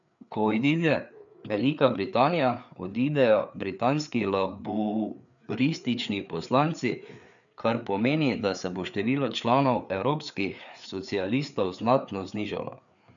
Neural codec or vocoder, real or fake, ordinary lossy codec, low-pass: codec, 16 kHz, 4 kbps, FreqCodec, larger model; fake; none; 7.2 kHz